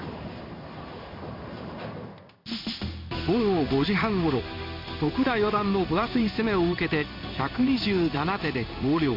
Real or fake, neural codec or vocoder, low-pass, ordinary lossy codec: fake; codec, 16 kHz in and 24 kHz out, 1 kbps, XY-Tokenizer; 5.4 kHz; MP3, 48 kbps